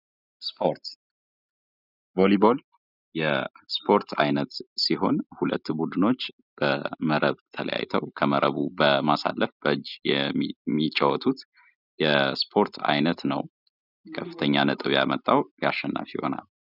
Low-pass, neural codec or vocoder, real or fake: 5.4 kHz; none; real